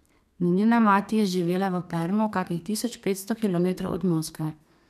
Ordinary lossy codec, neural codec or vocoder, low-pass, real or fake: none; codec, 32 kHz, 1.9 kbps, SNAC; 14.4 kHz; fake